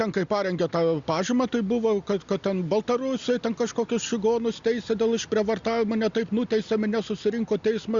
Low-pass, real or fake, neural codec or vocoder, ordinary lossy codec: 7.2 kHz; real; none; Opus, 64 kbps